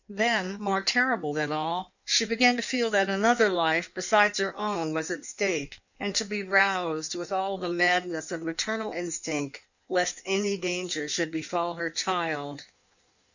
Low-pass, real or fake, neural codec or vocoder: 7.2 kHz; fake; codec, 16 kHz in and 24 kHz out, 1.1 kbps, FireRedTTS-2 codec